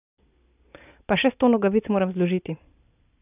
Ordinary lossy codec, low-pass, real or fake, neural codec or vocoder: none; 3.6 kHz; real; none